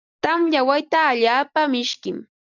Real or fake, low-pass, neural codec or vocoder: real; 7.2 kHz; none